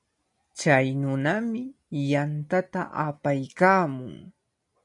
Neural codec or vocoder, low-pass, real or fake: none; 10.8 kHz; real